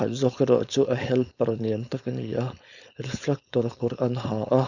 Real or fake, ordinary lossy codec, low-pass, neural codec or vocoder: fake; none; 7.2 kHz; codec, 16 kHz, 4.8 kbps, FACodec